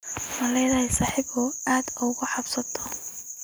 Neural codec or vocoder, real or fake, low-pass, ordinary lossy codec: none; real; none; none